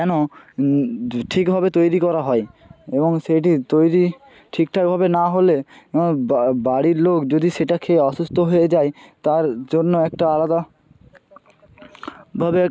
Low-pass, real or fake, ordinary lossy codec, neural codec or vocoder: none; real; none; none